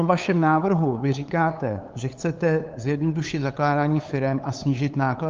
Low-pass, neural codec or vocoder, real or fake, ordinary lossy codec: 7.2 kHz; codec, 16 kHz, 8 kbps, FunCodec, trained on LibriTTS, 25 frames a second; fake; Opus, 24 kbps